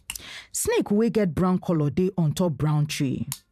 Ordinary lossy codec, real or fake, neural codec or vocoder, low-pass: none; fake; vocoder, 44.1 kHz, 128 mel bands every 512 samples, BigVGAN v2; 14.4 kHz